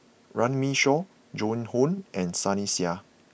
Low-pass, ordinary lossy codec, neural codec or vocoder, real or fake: none; none; none; real